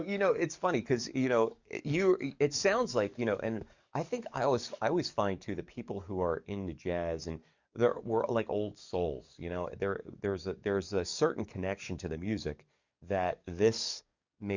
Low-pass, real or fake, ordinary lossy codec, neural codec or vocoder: 7.2 kHz; fake; Opus, 64 kbps; codec, 44.1 kHz, 7.8 kbps, DAC